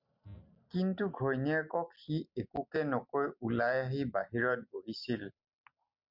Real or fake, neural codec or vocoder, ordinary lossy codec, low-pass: real; none; MP3, 48 kbps; 5.4 kHz